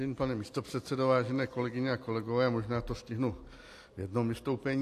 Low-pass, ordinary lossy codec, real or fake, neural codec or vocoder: 14.4 kHz; AAC, 64 kbps; real; none